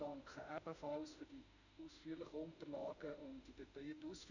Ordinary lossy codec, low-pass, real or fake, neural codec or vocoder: none; 7.2 kHz; fake; autoencoder, 48 kHz, 32 numbers a frame, DAC-VAE, trained on Japanese speech